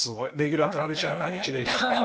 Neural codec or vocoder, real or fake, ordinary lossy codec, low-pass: codec, 16 kHz, 0.8 kbps, ZipCodec; fake; none; none